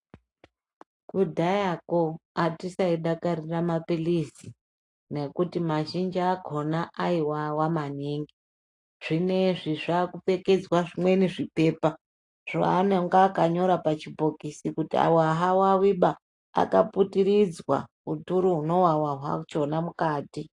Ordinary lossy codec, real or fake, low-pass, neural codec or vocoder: AAC, 48 kbps; real; 10.8 kHz; none